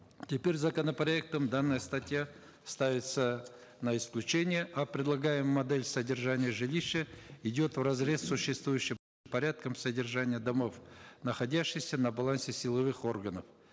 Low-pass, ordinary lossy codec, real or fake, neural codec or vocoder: none; none; real; none